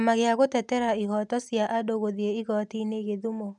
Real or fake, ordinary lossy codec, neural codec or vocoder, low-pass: real; none; none; 10.8 kHz